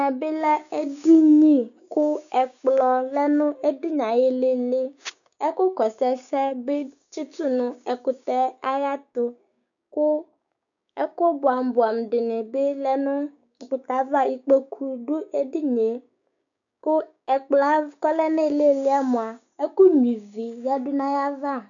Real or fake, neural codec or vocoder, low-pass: fake; codec, 16 kHz, 6 kbps, DAC; 7.2 kHz